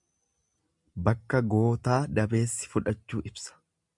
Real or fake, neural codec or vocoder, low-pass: real; none; 10.8 kHz